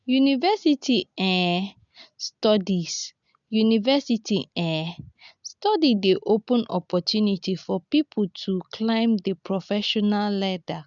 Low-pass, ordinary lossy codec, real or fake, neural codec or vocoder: 7.2 kHz; none; real; none